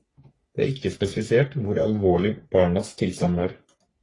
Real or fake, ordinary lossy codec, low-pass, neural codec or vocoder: fake; AAC, 32 kbps; 10.8 kHz; codec, 44.1 kHz, 3.4 kbps, Pupu-Codec